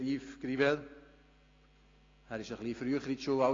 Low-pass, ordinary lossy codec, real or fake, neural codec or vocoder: 7.2 kHz; AAC, 32 kbps; real; none